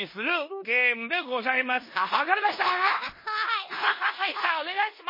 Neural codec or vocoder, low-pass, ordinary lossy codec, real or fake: codec, 16 kHz in and 24 kHz out, 0.9 kbps, LongCat-Audio-Codec, four codebook decoder; 5.4 kHz; MP3, 24 kbps; fake